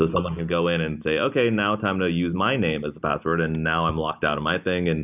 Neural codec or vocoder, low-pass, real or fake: none; 3.6 kHz; real